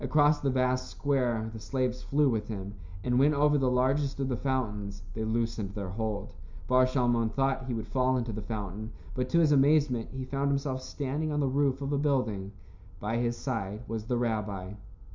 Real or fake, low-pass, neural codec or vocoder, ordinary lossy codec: real; 7.2 kHz; none; MP3, 64 kbps